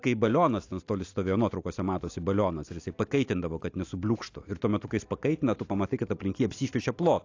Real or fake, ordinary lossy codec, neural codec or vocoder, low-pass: real; AAC, 48 kbps; none; 7.2 kHz